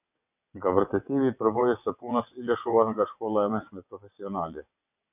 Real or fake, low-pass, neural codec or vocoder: fake; 3.6 kHz; vocoder, 22.05 kHz, 80 mel bands, WaveNeXt